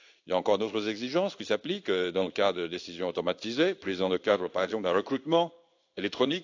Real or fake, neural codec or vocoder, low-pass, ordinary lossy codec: fake; codec, 16 kHz in and 24 kHz out, 1 kbps, XY-Tokenizer; 7.2 kHz; AAC, 48 kbps